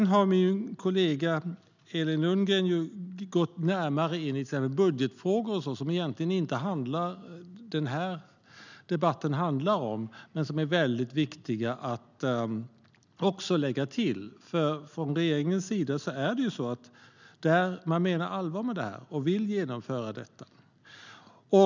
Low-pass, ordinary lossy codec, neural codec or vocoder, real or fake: 7.2 kHz; none; none; real